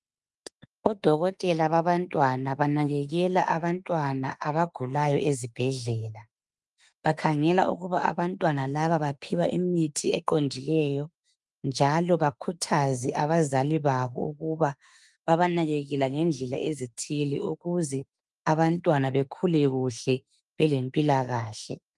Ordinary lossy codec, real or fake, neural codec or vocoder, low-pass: Opus, 32 kbps; fake; autoencoder, 48 kHz, 32 numbers a frame, DAC-VAE, trained on Japanese speech; 10.8 kHz